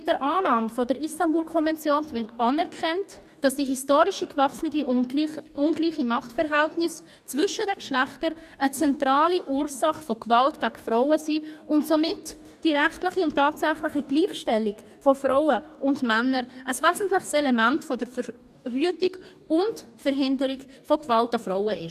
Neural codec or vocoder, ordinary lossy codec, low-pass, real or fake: codec, 44.1 kHz, 2.6 kbps, DAC; none; 14.4 kHz; fake